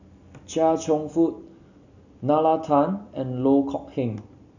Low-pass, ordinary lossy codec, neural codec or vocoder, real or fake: 7.2 kHz; none; none; real